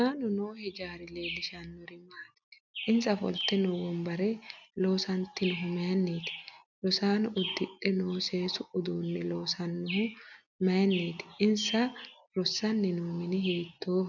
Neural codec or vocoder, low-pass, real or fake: none; 7.2 kHz; real